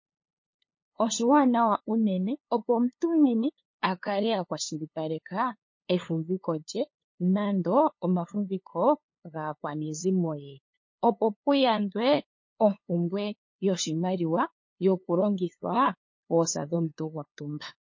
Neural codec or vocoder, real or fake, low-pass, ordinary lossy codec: codec, 16 kHz, 2 kbps, FunCodec, trained on LibriTTS, 25 frames a second; fake; 7.2 kHz; MP3, 32 kbps